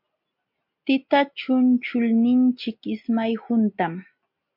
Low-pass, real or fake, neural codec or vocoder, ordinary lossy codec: 5.4 kHz; real; none; MP3, 48 kbps